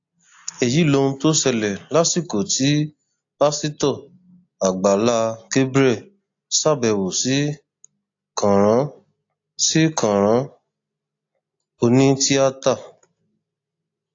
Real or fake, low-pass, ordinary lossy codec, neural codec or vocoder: real; 7.2 kHz; AAC, 48 kbps; none